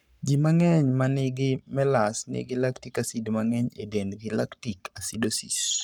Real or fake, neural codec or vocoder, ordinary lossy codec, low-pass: fake; codec, 44.1 kHz, 7.8 kbps, Pupu-Codec; none; 19.8 kHz